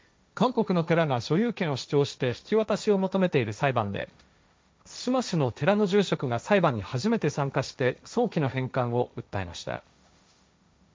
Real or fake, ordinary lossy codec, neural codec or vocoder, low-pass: fake; none; codec, 16 kHz, 1.1 kbps, Voila-Tokenizer; none